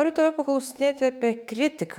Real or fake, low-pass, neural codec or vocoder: fake; 19.8 kHz; autoencoder, 48 kHz, 32 numbers a frame, DAC-VAE, trained on Japanese speech